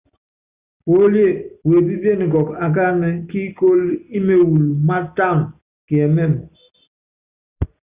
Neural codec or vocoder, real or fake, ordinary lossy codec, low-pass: none; real; Opus, 64 kbps; 3.6 kHz